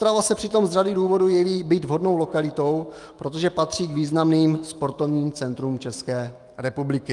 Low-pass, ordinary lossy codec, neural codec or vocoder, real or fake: 10.8 kHz; Opus, 32 kbps; autoencoder, 48 kHz, 128 numbers a frame, DAC-VAE, trained on Japanese speech; fake